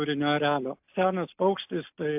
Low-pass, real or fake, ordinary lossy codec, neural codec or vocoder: 3.6 kHz; real; AAC, 32 kbps; none